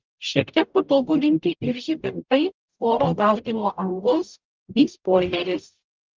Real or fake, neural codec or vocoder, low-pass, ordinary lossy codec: fake; codec, 44.1 kHz, 0.9 kbps, DAC; 7.2 kHz; Opus, 24 kbps